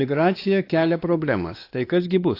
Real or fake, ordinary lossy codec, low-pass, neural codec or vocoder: fake; AAC, 32 kbps; 5.4 kHz; codec, 16 kHz, 2 kbps, X-Codec, WavLM features, trained on Multilingual LibriSpeech